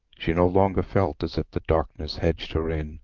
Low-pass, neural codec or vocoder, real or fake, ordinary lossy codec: 7.2 kHz; vocoder, 44.1 kHz, 128 mel bands, Pupu-Vocoder; fake; Opus, 32 kbps